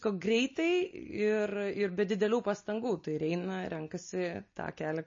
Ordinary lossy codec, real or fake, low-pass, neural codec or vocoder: MP3, 32 kbps; real; 7.2 kHz; none